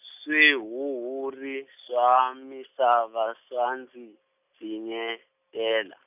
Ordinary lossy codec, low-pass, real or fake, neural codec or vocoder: none; 3.6 kHz; real; none